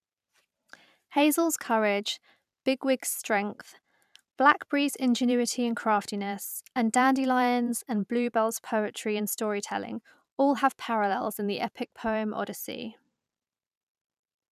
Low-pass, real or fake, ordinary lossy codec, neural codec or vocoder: 14.4 kHz; fake; none; vocoder, 44.1 kHz, 128 mel bands every 512 samples, BigVGAN v2